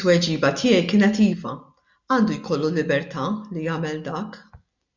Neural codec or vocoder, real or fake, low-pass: none; real; 7.2 kHz